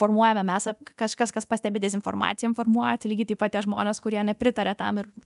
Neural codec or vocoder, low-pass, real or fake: codec, 24 kHz, 0.9 kbps, DualCodec; 10.8 kHz; fake